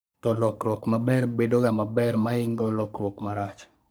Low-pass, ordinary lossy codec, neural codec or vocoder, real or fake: none; none; codec, 44.1 kHz, 3.4 kbps, Pupu-Codec; fake